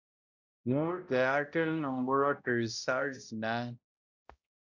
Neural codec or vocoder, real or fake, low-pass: codec, 16 kHz, 0.5 kbps, X-Codec, HuBERT features, trained on balanced general audio; fake; 7.2 kHz